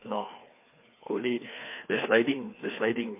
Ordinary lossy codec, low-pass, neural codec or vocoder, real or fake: MP3, 24 kbps; 3.6 kHz; codec, 16 kHz, 2 kbps, FreqCodec, larger model; fake